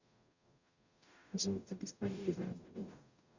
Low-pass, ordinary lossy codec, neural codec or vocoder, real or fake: 7.2 kHz; none; codec, 44.1 kHz, 0.9 kbps, DAC; fake